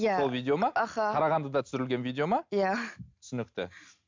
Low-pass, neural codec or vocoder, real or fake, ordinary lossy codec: 7.2 kHz; none; real; MP3, 64 kbps